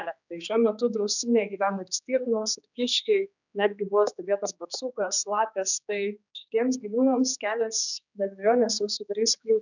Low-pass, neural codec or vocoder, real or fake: 7.2 kHz; codec, 16 kHz, 4 kbps, X-Codec, HuBERT features, trained on general audio; fake